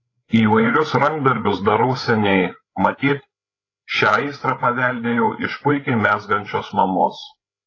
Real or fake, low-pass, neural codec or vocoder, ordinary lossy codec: fake; 7.2 kHz; codec, 16 kHz, 8 kbps, FreqCodec, larger model; AAC, 32 kbps